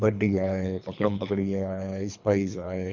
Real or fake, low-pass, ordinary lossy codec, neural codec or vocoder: fake; 7.2 kHz; none; codec, 24 kHz, 3 kbps, HILCodec